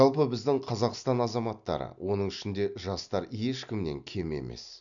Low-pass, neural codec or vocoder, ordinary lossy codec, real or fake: 7.2 kHz; none; none; real